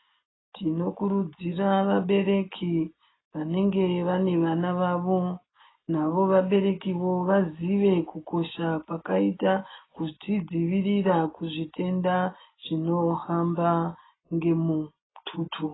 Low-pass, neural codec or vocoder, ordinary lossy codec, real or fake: 7.2 kHz; none; AAC, 16 kbps; real